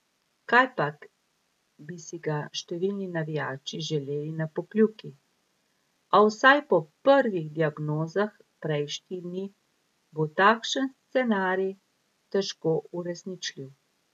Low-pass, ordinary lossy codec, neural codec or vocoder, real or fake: 14.4 kHz; AAC, 96 kbps; none; real